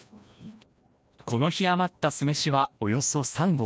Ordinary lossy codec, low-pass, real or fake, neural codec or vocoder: none; none; fake; codec, 16 kHz, 1 kbps, FreqCodec, larger model